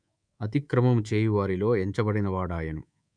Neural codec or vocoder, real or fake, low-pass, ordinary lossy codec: codec, 24 kHz, 3.1 kbps, DualCodec; fake; 9.9 kHz; none